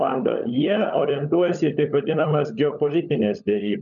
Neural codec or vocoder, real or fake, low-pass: codec, 16 kHz, 16 kbps, FunCodec, trained on LibriTTS, 50 frames a second; fake; 7.2 kHz